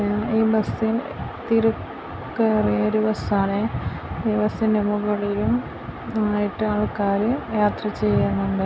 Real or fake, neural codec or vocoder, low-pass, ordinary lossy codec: real; none; none; none